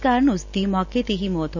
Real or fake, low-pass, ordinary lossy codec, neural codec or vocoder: real; 7.2 kHz; none; none